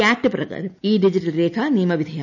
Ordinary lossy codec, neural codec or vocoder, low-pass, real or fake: none; none; 7.2 kHz; real